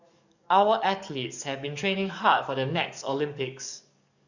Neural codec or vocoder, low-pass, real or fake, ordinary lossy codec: codec, 44.1 kHz, 7.8 kbps, DAC; 7.2 kHz; fake; none